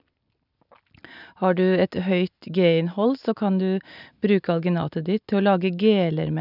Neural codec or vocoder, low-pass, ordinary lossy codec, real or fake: none; 5.4 kHz; none; real